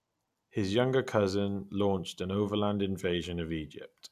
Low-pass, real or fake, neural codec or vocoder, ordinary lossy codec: 14.4 kHz; real; none; none